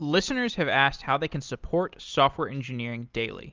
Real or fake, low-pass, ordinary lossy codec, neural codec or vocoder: real; 7.2 kHz; Opus, 32 kbps; none